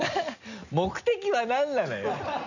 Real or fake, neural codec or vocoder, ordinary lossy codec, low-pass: real; none; none; 7.2 kHz